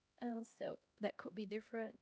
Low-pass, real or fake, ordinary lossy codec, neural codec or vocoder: none; fake; none; codec, 16 kHz, 1 kbps, X-Codec, HuBERT features, trained on LibriSpeech